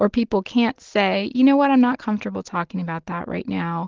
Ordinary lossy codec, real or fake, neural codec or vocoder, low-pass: Opus, 16 kbps; real; none; 7.2 kHz